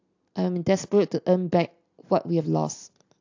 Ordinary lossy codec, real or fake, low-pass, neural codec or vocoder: AAC, 48 kbps; real; 7.2 kHz; none